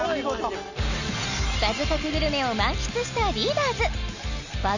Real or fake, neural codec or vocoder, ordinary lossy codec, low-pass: real; none; none; 7.2 kHz